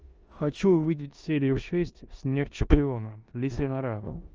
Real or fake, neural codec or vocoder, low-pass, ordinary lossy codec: fake; codec, 16 kHz in and 24 kHz out, 0.9 kbps, LongCat-Audio-Codec, four codebook decoder; 7.2 kHz; Opus, 24 kbps